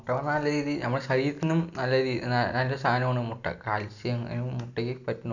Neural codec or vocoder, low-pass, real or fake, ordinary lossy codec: none; 7.2 kHz; real; none